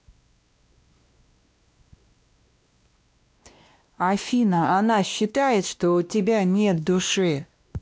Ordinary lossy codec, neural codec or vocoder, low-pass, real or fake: none; codec, 16 kHz, 1 kbps, X-Codec, WavLM features, trained on Multilingual LibriSpeech; none; fake